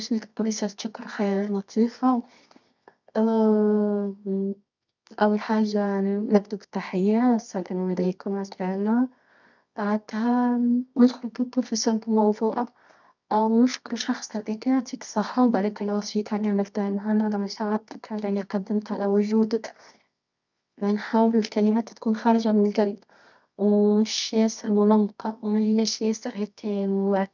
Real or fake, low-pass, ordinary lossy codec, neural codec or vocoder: fake; 7.2 kHz; none; codec, 24 kHz, 0.9 kbps, WavTokenizer, medium music audio release